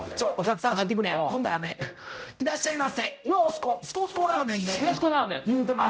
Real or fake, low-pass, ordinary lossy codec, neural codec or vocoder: fake; none; none; codec, 16 kHz, 0.5 kbps, X-Codec, HuBERT features, trained on general audio